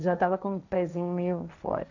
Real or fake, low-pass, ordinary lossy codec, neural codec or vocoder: fake; 7.2 kHz; none; codec, 16 kHz, 1.1 kbps, Voila-Tokenizer